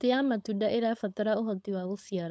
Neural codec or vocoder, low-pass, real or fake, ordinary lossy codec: codec, 16 kHz, 4.8 kbps, FACodec; none; fake; none